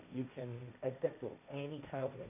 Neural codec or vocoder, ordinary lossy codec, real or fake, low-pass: codec, 16 kHz, 1.1 kbps, Voila-Tokenizer; none; fake; 3.6 kHz